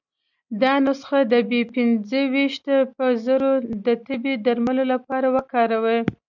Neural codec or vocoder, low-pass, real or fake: none; 7.2 kHz; real